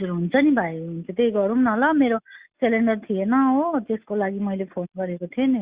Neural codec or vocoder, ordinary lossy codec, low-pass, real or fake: none; Opus, 64 kbps; 3.6 kHz; real